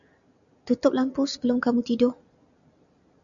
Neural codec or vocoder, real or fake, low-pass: none; real; 7.2 kHz